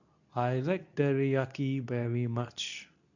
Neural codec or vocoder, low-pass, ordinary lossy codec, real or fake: codec, 24 kHz, 0.9 kbps, WavTokenizer, medium speech release version 2; 7.2 kHz; none; fake